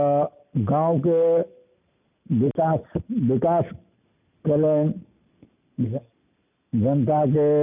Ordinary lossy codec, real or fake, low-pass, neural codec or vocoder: none; real; 3.6 kHz; none